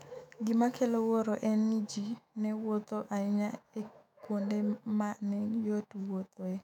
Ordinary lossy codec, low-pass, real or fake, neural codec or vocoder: none; 19.8 kHz; fake; autoencoder, 48 kHz, 128 numbers a frame, DAC-VAE, trained on Japanese speech